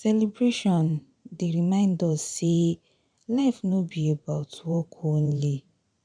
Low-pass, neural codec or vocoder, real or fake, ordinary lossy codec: 9.9 kHz; vocoder, 44.1 kHz, 128 mel bands every 512 samples, BigVGAN v2; fake; none